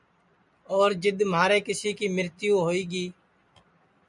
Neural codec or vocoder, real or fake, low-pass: none; real; 10.8 kHz